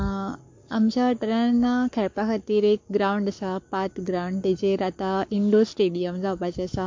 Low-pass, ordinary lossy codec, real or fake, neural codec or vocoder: 7.2 kHz; MP3, 48 kbps; fake; codec, 44.1 kHz, 7.8 kbps, Pupu-Codec